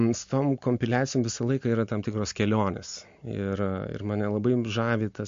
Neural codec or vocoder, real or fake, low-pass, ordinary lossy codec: none; real; 7.2 kHz; MP3, 48 kbps